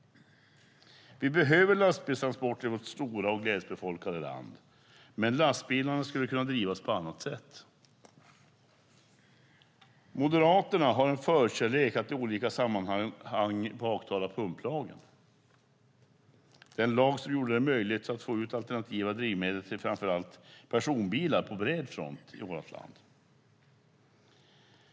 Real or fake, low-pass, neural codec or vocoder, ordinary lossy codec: real; none; none; none